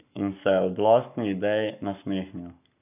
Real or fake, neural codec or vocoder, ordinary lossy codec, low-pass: fake; codec, 44.1 kHz, 7.8 kbps, Pupu-Codec; none; 3.6 kHz